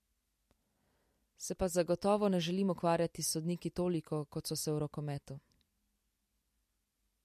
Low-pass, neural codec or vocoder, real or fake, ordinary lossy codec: 14.4 kHz; none; real; MP3, 64 kbps